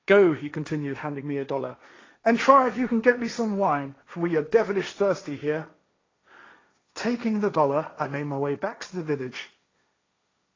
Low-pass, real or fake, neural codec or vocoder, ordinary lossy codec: 7.2 kHz; fake; codec, 16 kHz, 1.1 kbps, Voila-Tokenizer; AAC, 32 kbps